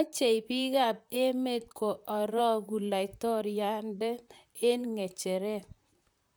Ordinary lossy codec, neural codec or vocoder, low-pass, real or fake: none; vocoder, 44.1 kHz, 128 mel bands, Pupu-Vocoder; none; fake